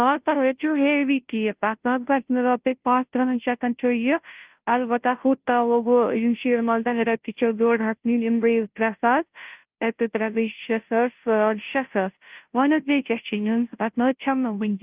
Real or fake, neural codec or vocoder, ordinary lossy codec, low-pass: fake; codec, 16 kHz, 0.5 kbps, FunCodec, trained on Chinese and English, 25 frames a second; Opus, 32 kbps; 3.6 kHz